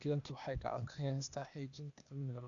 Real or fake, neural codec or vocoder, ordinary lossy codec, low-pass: fake; codec, 16 kHz, 0.8 kbps, ZipCodec; none; 7.2 kHz